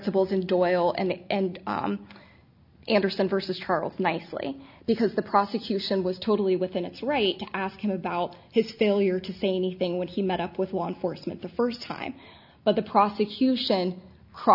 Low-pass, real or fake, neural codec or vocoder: 5.4 kHz; real; none